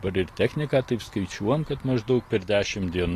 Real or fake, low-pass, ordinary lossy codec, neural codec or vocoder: fake; 14.4 kHz; AAC, 48 kbps; vocoder, 44.1 kHz, 128 mel bands every 256 samples, BigVGAN v2